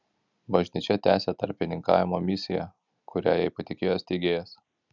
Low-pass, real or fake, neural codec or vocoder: 7.2 kHz; real; none